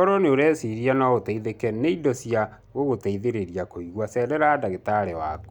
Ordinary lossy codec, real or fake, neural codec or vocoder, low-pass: none; real; none; 19.8 kHz